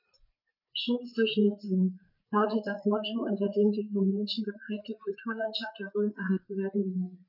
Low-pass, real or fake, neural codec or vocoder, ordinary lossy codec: 5.4 kHz; fake; codec, 16 kHz, 4 kbps, FreqCodec, larger model; none